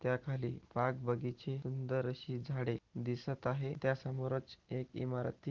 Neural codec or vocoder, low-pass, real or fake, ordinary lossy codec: none; 7.2 kHz; real; Opus, 16 kbps